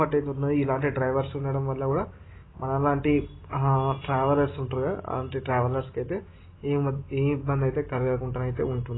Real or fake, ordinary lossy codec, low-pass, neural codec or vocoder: real; AAC, 16 kbps; 7.2 kHz; none